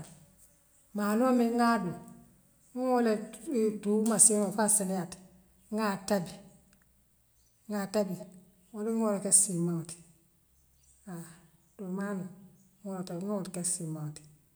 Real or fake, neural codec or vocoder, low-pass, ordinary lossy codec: real; none; none; none